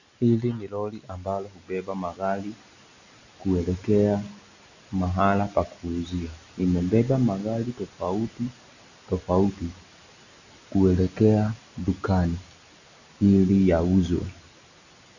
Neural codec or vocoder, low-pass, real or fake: none; 7.2 kHz; real